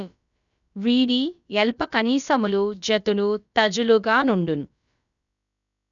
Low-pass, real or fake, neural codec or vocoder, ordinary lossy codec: 7.2 kHz; fake; codec, 16 kHz, about 1 kbps, DyCAST, with the encoder's durations; none